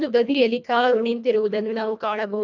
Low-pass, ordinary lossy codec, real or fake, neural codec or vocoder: 7.2 kHz; none; fake; codec, 24 kHz, 1.5 kbps, HILCodec